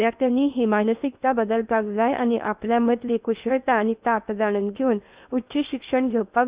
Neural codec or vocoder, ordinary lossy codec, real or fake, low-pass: codec, 16 kHz in and 24 kHz out, 0.8 kbps, FocalCodec, streaming, 65536 codes; Opus, 64 kbps; fake; 3.6 kHz